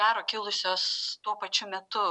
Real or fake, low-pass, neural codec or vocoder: real; 10.8 kHz; none